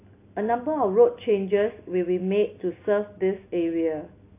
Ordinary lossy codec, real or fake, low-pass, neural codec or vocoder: AAC, 24 kbps; real; 3.6 kHz; none